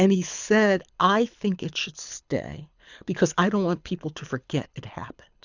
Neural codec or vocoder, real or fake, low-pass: codec, 24 kHz, 6 kbps, HILCodec; fake; 7.2 kHz